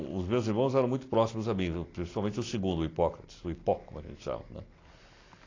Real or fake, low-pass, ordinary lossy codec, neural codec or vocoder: real; 7.2 kHz; AAC, 32 kbps; none